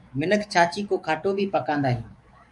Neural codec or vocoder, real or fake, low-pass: codec, 44.1 kHz, 7.8 kbps, DAC; fake; 10.8 kHz